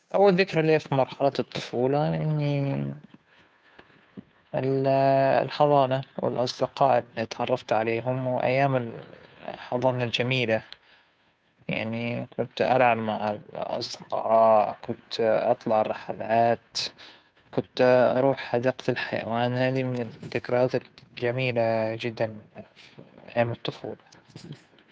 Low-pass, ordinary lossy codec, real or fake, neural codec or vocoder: none; none; fake; codec, 16 kHz, 2 kbps, FunCodec, trained on Chinese and English, 25 frames a second